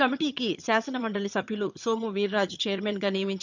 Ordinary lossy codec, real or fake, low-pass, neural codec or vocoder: none; fake; 7.2 kHz; vocoder, 22.05 kHz, 80 mel bands, HiFi-GAN